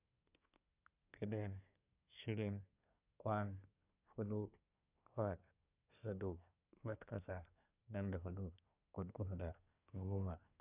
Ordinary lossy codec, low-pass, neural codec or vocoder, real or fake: none; 3.6 kHz; codec, 24 kHz, 1 kbps, SNAC; fake